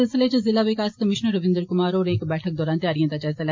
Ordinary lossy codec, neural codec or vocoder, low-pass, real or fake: MP3, 48 kbps; none; 7.2 kHz; real